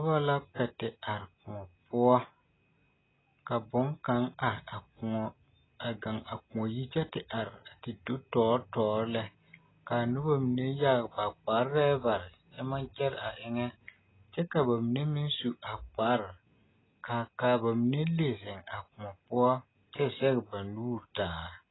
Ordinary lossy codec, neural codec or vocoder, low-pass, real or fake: AAC, 16 kbps; none; 7.2 kHz; real